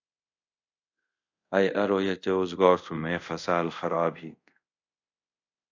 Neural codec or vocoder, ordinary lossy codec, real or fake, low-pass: codec, 24 kHz, 0.5 kbps, DualCodec; AAC, 48 kbps; fake; 7.2 kHz